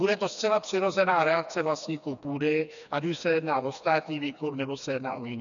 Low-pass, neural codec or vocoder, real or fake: 7.2 kHz; codec, 16 kHz, 2 kbps, FreqCodec, smaller model; fake